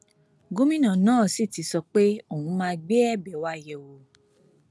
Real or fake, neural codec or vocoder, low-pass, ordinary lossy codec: real; none; none; none